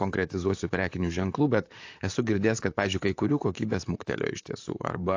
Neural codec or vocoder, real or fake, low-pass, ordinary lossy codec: vocoder, 44.1 kHz, 128 mel bands, Pupu-Vocoder; fake; 7.2 kHz; AAC, 48 kbps